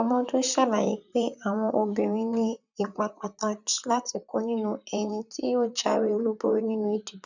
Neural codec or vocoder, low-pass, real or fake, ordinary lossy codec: vocoder, 44.1 kHz, 128 mel bands, Pupu-Vocoder; 7.2 kHz; fake; none